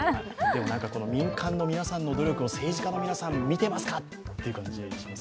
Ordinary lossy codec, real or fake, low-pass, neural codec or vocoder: none; real; none; none